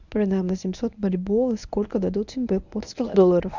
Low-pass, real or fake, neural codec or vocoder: 7.2 kHz; fake; codec, 24 kHz, 0.9 kbps, WavTokenizer, medium speech release version 1